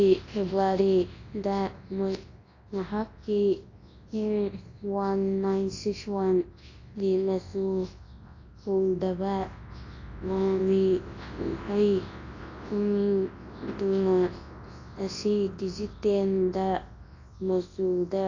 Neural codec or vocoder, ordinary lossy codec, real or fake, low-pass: codec, 24 kHz, 0.9 kbps, WavTokenizer, large speech release; AAC, 32 kbps; fake; 7.2 kHz